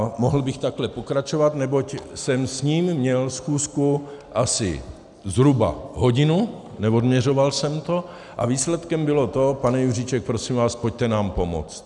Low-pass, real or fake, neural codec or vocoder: 10.8 kHz; real; none